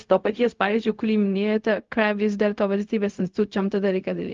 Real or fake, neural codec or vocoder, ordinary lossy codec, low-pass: fake; codec, 16 kHz, 0.4 kbps, LongCat-Audio-Codec; Opus, 32 kbps; 7.2 kHz